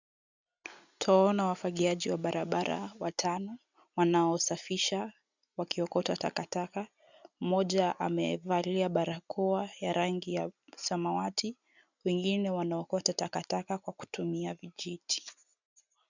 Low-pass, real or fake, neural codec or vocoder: 7.2 kHz; real; none